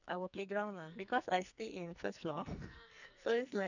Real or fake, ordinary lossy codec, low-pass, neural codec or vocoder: fake; none; 7.2 kHz; codec, 44.1 kHz, 2.6 kbps, SNAC